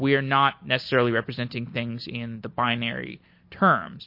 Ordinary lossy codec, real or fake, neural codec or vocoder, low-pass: MP3, 32 kbps; real; none; 5.4 kHz